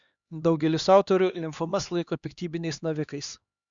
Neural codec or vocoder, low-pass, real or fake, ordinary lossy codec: codec, 16 kHz, 2 kbps, X-Codec, HuBERT features, trained on LibriSpeech; 7.2 kHz; fake; Opus, 64 kbps